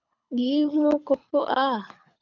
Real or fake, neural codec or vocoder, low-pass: fake; codec, 24 kHz, 6 kbps, HILCodec; 7.2 kHz